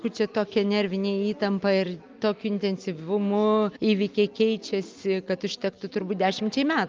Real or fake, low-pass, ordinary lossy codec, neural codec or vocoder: real; 7.2 kHz; Opus, 24 kbps; none